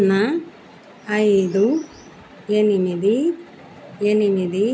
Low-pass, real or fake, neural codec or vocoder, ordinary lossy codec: none; real; none; none